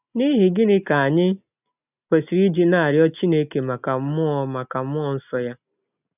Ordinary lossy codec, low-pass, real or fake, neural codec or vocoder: none; 3.6 kHz; real; none